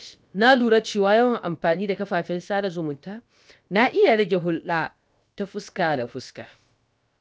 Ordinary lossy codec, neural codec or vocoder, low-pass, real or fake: none; codec, 16 kHz, about 1 kbps, DyCAST, with the encoder's durations; none; fake